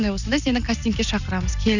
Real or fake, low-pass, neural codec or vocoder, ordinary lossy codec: real; 7.2 kHz; none; none